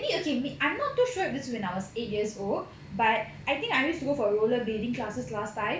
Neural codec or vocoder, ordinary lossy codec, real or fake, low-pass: none; none; real; none